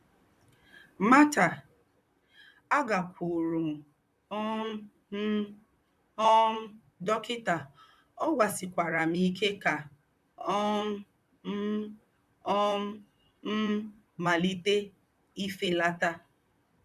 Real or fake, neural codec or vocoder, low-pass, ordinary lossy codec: fake; vocoder, 44.1 kHz, 128 mel bands, Pupu-Vocoder; 14.4 kHz; none